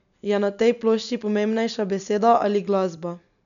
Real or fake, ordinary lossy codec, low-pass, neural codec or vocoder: real; none; 7.2 kHz; none